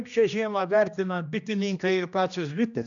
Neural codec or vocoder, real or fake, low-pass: codec, 16 kHz, 1 kbps, X-Codec, HuBERT features, trained on general audio; fake; 7.2 kHz